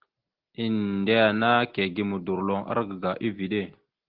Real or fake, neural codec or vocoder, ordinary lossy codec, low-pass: real; none; Opus, 16 kbps; 5.4 kHz